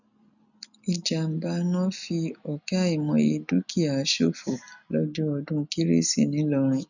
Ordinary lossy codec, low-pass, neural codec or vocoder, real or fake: none; 7.2 kHz; none; real